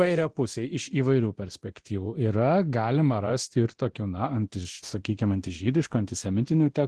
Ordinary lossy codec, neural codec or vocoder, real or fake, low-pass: Opus, 16 kbps; codec, 24 kHz, 0.9 kbps, DualCodec; fake; 10.8 kHz